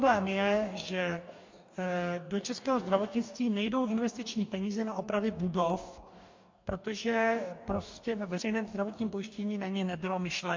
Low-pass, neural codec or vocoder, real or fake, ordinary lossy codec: 7.2 kHz; codec, 44.1 kHz, 2.6 kbps, DAC; fake; MP3, 48 kbps